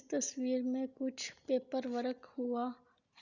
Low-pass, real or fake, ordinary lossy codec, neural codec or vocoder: 7.2 kHz; real; Opus, 64 kbps; none